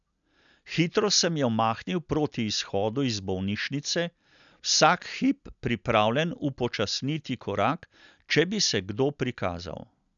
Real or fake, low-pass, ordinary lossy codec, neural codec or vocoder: real; 7.2 kHz; none; none